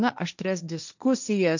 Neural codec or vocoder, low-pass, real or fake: codec, 16 kHz, 1.1 kbps, Voila-Tokenizer; 7.2 kHz; fake